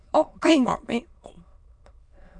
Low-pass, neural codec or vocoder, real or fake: 9.9 kHz; autoencoder, 22.05 kHz, a latent of 192 numbers a frame, VITS, trained on many speakers; fake